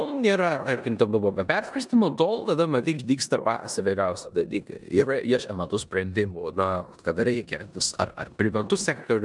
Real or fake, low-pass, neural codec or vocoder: fake; 10.8 kHz; codec, 16 kHz in and 24 kHz out, 0.9 kbps, LongCat-Audio-Codec, four codebook decoder